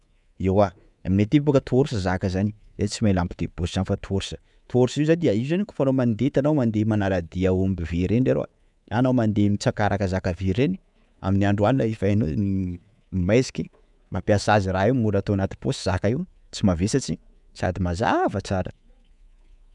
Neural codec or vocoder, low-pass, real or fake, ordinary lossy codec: codec, 24 kHz, 3.1 kbps, DualCodec; 10.8 kHz; fake; none